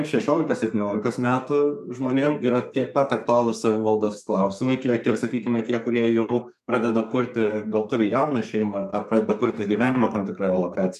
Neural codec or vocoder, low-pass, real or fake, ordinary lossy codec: codec, 32 kHz, 1.9 kbps, SNAC; 14.4 kHz; fake; MP3, 96 kbps